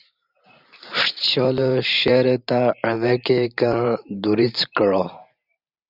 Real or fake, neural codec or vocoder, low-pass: fake; vocoder, 44.1 kHz, 128 mel bands every 512 samples, BigVGAN v2; 5.4 kHz